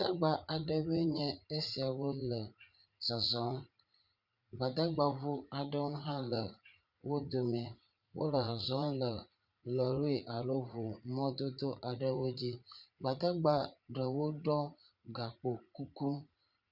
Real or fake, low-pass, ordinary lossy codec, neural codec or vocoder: fake; 5.4 kHz; Opus, 64 kbps; vocoder, 44.1 kHz, 80 mel bands, Vocos